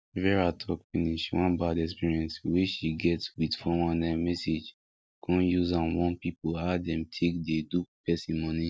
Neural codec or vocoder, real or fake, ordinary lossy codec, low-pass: none; real; none; none